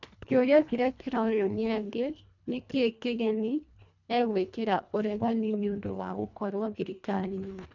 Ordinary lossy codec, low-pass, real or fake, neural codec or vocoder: none; 7.2 kHz; fake; codec, 24 kHz, 1.5 kbps, HILCodec